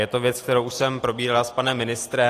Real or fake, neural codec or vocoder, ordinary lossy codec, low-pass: real; none; AAC, 48 kbps; 14.4 kHz